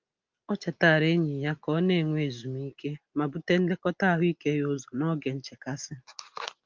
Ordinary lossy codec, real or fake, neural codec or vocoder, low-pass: Opus, 24 kbps; real; none; 7.2 kHz